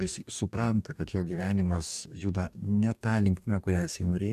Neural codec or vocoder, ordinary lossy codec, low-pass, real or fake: codec, 44.1 kHz, 2.6 kbps, DAC; MP3, 96 kbps; 14.4 kHz; fake